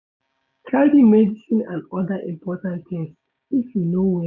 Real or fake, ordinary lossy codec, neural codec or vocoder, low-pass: fake; none; codec, 16 kHz, 6 kbps, DAC; 7.2 kHz